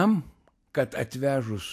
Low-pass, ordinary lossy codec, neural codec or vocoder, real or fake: 14.4 kHz; AAC, 64 kbps; none; real